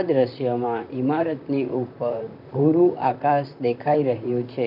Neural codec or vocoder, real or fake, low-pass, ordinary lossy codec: vocoder, 44.1 kHz, 128 mel bands, Pupu-Vocoder; fake; 5.4 kHz; none